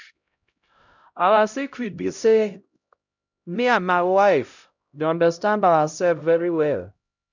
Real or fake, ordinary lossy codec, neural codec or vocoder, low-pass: fake; none; codec, 16 kHz, 0.5 kbps, X-Codec, HuBERT features, trained on LibriSpeech; 7.2 kHz